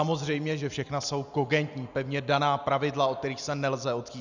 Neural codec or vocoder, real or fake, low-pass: none; real; 7.2 kHz